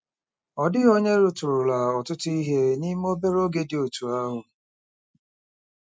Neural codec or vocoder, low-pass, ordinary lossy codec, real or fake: none; none; none; real